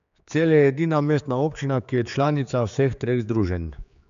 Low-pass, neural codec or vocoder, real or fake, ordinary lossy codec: 7.2 kHz; codec, 16 kHz, 4 kbps, X-Codec, HuBERT features, trained on general audio; fake; MP3, 64 kbps